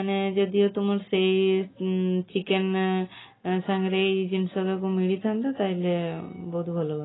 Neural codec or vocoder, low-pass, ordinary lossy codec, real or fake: none; 7.2 kHz; AAC, 16 kbps; real